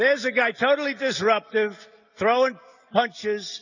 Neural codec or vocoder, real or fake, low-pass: none; real; 7.2 kHz